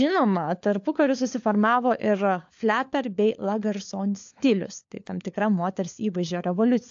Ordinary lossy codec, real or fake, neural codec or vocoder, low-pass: AAC, 64 kbps; fake; codec, 16 kHz, 4 kbps, FunCodec, trained on LibriTTS, 50 frames a second; 7.2 kHz